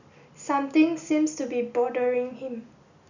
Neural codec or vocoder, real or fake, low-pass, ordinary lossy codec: none; real; 7.2 kHz; none